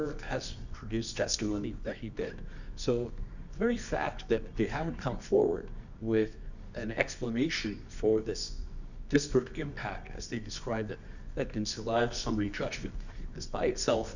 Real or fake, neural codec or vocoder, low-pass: fake; codec, 24 kHz, 0.9 kbps, WavTokenizer, medium music audio release; 7.2 kHz